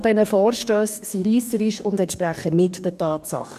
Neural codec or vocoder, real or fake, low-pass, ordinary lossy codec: codec, 44.1 kHz, 2.6 kbps, DAC; fake; 14.4 kHz; none